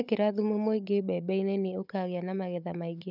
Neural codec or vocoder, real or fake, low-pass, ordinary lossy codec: codec, 16 kHz, 16 kbps, FunCodec, trained on Chinese and English, 50 frames a second; fake; 5.4 kHz; none